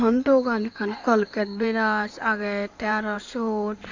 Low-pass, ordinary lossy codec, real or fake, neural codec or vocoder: 7.2 kHz; AAC, 48 kbps; fake; codec, 16 kHz in and 24 kHz out, 2.2 kbps, FireRedTTS-2 codec